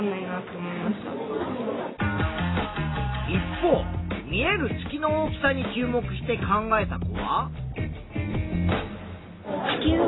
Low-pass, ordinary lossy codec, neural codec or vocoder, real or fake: 7.2 kHz; AAC, 16 kbps; none; real